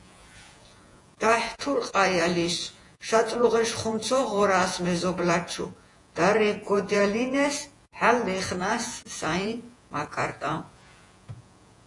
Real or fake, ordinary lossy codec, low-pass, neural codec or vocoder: fake; AAC, 48 kbps; 10.8 kHz; vocoder, 48 kHz, 128 mel bands, Vocos